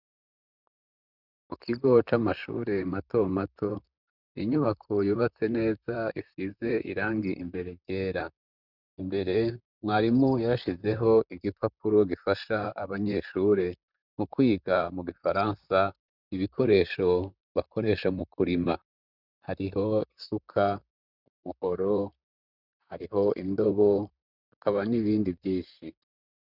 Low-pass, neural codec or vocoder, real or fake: 5.4 kHz; vocoder, 44.1 kHz, 128 mel bands, Pupu-Vocoder; fake